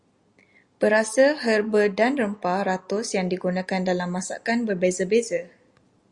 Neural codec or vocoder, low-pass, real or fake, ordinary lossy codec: none; 10.8 kHz; real; Opus, 64 kbps